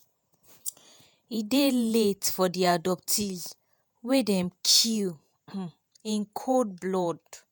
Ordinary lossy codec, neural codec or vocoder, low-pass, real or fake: none; vocoder, 48 kHz, 128 mel bands, Vocos; none; fake